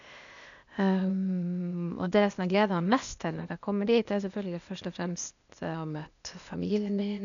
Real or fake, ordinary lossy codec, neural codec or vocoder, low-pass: fake; none; codec, 16 kHz, 0.8 kbps, ZipCodec; 7.2 kHz